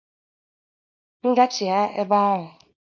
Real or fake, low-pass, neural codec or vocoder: fake; 7.2 kHz; codec, 24 kHz, 0.9 kbps, WavTokenizer, small release